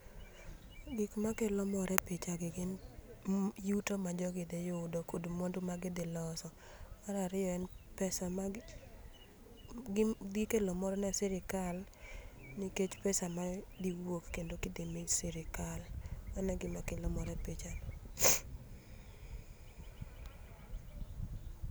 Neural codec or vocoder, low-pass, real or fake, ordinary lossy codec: none; none; real; none